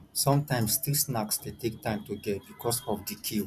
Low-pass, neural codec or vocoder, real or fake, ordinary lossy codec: 14.4 kHz; none; real; none